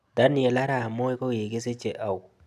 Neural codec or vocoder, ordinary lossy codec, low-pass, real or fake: none; none; 14.4 kHz; real